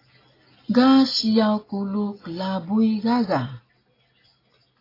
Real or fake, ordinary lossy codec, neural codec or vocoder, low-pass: real; AAC, 24 kbps; none; 5.4 kHz